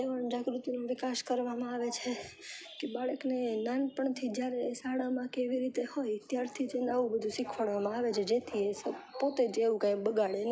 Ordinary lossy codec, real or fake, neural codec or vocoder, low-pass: none; real; none; none